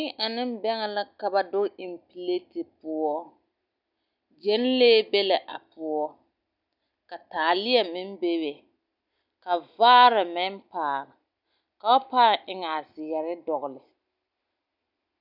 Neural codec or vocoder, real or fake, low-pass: none; real; 5.4 kHz